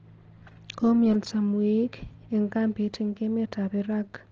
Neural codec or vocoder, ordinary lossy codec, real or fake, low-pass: none; Opus, 16 kbps; real; 7.2 kHz